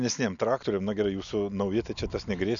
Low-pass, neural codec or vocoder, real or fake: 7.2 kHz; none; real